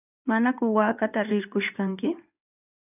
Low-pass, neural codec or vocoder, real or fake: 3.6 kHz; codec, 16 kHz in and 24 kHz out, 2.2 kbps, FireRedTTS-2 codec; fake